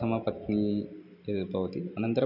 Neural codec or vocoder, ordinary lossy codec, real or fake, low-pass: none; none; real; 5.4 kHz